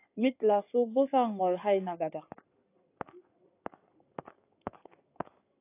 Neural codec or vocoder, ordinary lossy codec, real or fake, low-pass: codec, 16 kHz in and 24 kHz out, 2.2 kbps, FireRedTTS-2 codec; AAC, 24 kbps; fake; 3.6 kHz